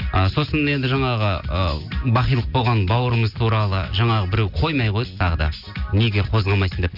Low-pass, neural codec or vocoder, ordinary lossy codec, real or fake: 5.4 kHz; none; none; real